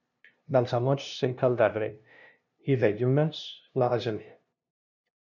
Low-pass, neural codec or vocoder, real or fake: 7.2 kHz; codec, 16 kHz, 0.5 kbps, FunCodec, trained on LibriTTS, 25 frames a second; fake